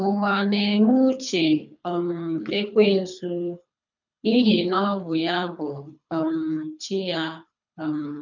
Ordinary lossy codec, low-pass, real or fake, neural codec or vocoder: none; 7.2 kHz; fake; codec, 24 kHz, 3 kbps, HILCodec